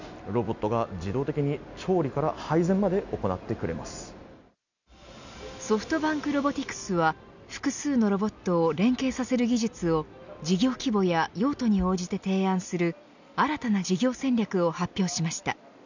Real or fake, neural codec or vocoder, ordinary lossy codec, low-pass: real; none; none; 7.2 kHz